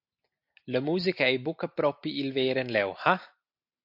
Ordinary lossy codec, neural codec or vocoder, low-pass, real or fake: MP3, 48 kbps; none; 5.4 kHz; real